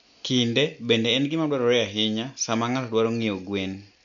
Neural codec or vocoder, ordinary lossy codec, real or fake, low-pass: none; none; real; 7.2 kHz